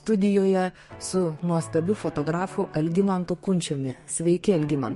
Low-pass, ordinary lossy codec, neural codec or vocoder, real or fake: 14.4 kHz; MP3, 48 kbps; codec, 32 kHz, 1.9 kbps, SNAC; fake